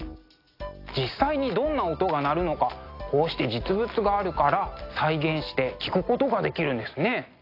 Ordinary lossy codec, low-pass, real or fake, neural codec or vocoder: AAC, 32 kbps; 5.4 kHz; real; none